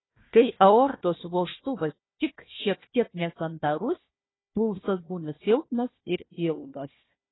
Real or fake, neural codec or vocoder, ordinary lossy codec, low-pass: fake; codec, 16 kHz, 1 kbps, FunCodec, trained on Chinese and English, 50 frames a second; AAC, 16 kbps; 7.2 kHz